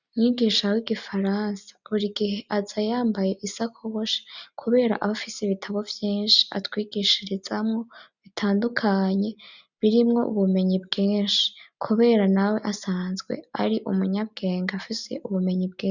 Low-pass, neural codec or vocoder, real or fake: 7.2 kHz; none; real